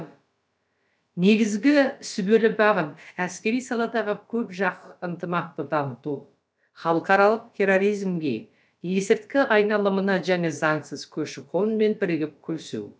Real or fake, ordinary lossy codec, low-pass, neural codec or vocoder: fake; none; none; codec, 16 kHz, about 1 kbps, DyCAST, with the encoder's durations